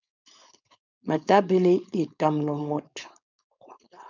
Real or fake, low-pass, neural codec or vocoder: fake; 7.2 kHz; codec, 16 kHz, 4.8 kbps, FACodec